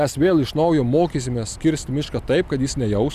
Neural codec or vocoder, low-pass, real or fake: none; 14.4 kHz; real